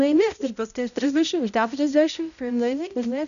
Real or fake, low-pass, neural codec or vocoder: fake; 7.2 kHz; codec, 16 kHz, 0.5 kbps, X-Codec, HuBERT features, trained on balanced general audio